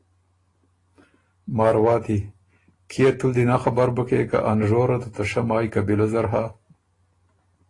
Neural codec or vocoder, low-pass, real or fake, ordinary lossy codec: none; 10.8 kHz; real; AAC, 32 kbps